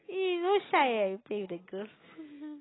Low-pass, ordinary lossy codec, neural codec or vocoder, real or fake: 7.2 kHz; AAC, 16 kbps; none; real